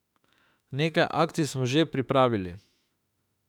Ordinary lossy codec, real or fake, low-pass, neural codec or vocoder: none; fake; 19.8 kHz; autoencoder, 48 kHz, 32 numbers a frame, DAC-VAE, trained on Japanese speech